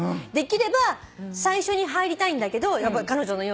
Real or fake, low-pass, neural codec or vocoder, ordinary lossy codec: real; none; none; none